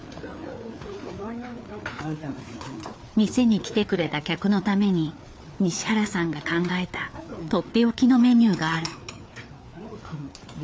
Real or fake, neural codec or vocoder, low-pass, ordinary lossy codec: fake; codec, 16 kHz, 4 kbps, FreqCodec, larger model; none; none